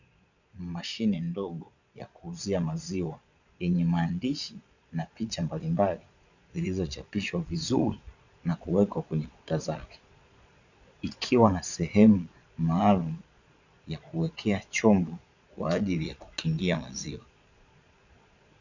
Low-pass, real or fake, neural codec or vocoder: 7.2 kHz; fake; vocoder, 22.05 kHz, 80 mel bands, Vocos